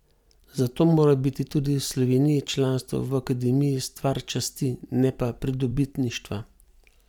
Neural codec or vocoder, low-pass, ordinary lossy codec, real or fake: vocoder, 44.1 kHz, 128 mel bands every 256 samples, BigVGAN v2; 19.8 kHz; none; fake